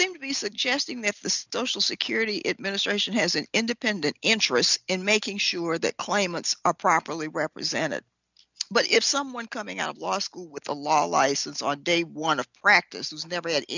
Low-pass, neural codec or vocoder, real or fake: 7.2 kHz; none; real